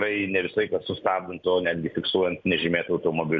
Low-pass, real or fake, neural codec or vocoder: 7.2 kHz; real; none